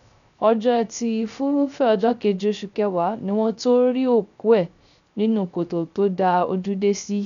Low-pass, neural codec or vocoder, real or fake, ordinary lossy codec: 7.2 kHz; codec, 16 kHz, 0.3 kbps, FocalCodec; fake; none